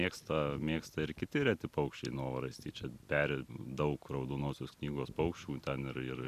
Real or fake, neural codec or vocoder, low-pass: real; none; 14.4 kHz